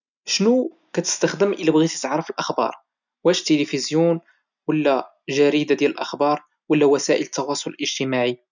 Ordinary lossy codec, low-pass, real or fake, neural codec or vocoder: none; 7.2 kHz; real; none